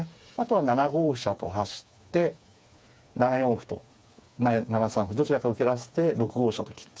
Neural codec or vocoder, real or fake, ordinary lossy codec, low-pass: codec, 16 kHz, 4 kbps, FreqCodec, smaller model; fake; none; none